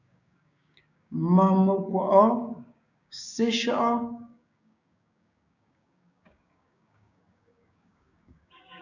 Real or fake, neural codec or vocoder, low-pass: fake; codec, 16 kHz, 6 kbps, DAC; 7.2 kHz